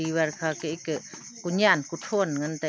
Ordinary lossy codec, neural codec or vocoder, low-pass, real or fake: none; none; none; real